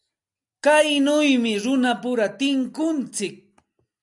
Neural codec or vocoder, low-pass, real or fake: none; 10.8 kHz; real